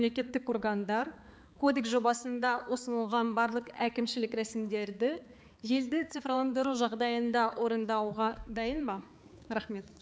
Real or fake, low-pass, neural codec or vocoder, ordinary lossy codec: fake; none; codec, 16 kHz, 4 kbps, X-Codec, HuBERT features, trained on balanced general audio; none